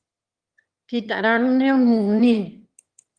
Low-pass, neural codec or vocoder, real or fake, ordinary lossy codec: 9.9 kHz; autoencoder, 22.05 kHz, a latent of 192 numbers a frame, VITS, trained on one speaker; fake; Opus, 24 kbps